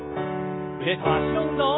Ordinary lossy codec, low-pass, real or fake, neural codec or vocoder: AAC, 16 kbps; 7.2 kHz; real; none